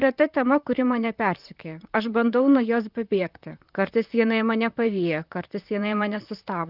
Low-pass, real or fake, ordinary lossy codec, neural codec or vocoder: 5.4 kHz; fake; Opus, 32 kbps; vocoder, 44.1 kHz, 128 mel bands, Pupu-Vocoder